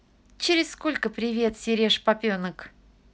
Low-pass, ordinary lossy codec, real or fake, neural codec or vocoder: none; none; real; none